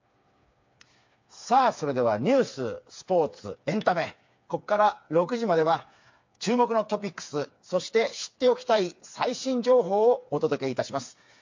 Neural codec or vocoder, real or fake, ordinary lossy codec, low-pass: codec, 16 kHz, 4 kbps, FreqCodec, smaller model; fake; MP3, 48 kbps; 7.2 kHz